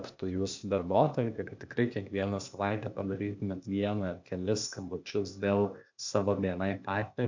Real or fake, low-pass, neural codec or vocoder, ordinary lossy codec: fake; 7.2 kHz; codec, 16 kHz, 0.8 kbps, ZipCodec; MP3, 64 kbps